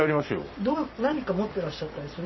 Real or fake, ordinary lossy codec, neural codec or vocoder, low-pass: fake; MP3, 24 kbps; vocoder, 44.1 kHz, 128 mel bands every 256 samples, BigVGAN v2; 7.2 kHz